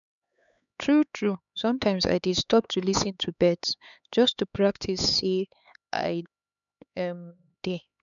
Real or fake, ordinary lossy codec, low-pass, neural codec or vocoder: fake; none; 7.2 kHz; codec, 16 kHz, 4 kbps, X-Codec, HuBERT features, trained on LibriSpeech